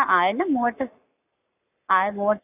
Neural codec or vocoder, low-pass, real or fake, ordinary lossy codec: codec, 44.1 kHz, 7.8 kbps, Pupu-Codec; 3.6 kHz; fake; none